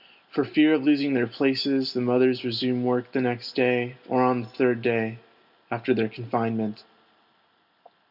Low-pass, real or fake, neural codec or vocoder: 5.4 kHz; real; none